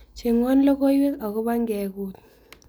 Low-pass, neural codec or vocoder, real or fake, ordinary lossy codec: none; none; real; none